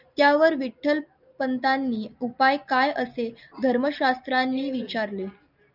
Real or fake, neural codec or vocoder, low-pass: real; none; 5.4 kHz